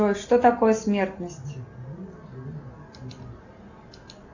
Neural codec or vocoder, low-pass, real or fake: none; 7.2 kHz; real